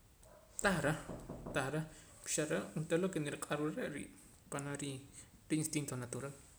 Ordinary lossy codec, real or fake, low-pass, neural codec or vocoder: none; real; none; none